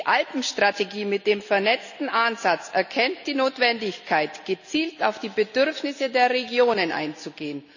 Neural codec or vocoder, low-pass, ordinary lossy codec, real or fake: none; 7.2 kHz; none; real